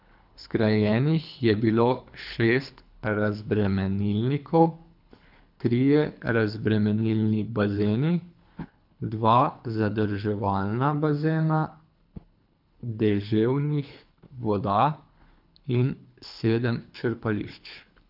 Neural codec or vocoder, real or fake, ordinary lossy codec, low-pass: codec, 24 kHz, 3 kbps, HILCodec; fake; none; 5.4 kHz